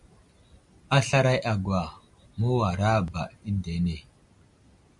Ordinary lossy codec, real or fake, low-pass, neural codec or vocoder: MP3, 96 kbps; real; 10.8 kHz; none